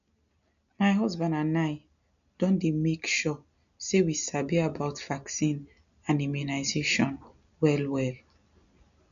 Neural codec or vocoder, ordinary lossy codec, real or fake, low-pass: none; none; real; 7.2 kHz